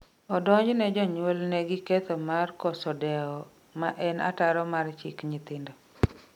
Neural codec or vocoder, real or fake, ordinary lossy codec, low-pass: none; real; none; 19.8 kHz